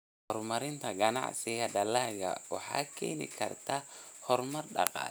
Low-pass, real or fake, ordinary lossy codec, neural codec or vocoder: none; real; none; none